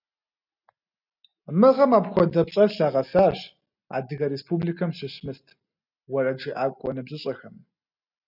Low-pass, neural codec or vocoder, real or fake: 5.4 kHz; none; real